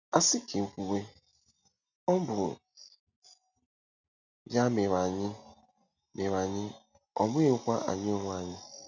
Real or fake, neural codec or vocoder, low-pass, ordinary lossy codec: real; none; 7.2 kHz; none